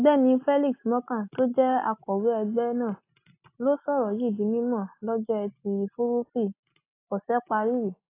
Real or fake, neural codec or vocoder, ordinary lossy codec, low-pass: real; none; AAC, 24 kbps; 3.6 kHz